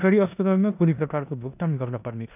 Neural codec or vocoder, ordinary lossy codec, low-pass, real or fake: codec, 16 kHz in and 24 kHz out, 0.9 kbps, LongCat-Audio-Codec, four codebook decoder; none; 3.6 kHz; fake